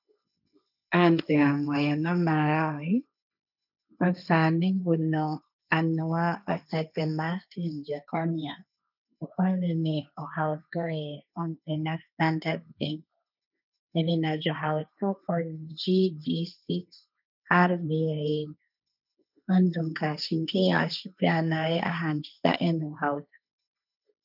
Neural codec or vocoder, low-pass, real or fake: codec, 16 kHz, 1.1 kbps, Voila-Tokenizer; 5.4 kHz; fake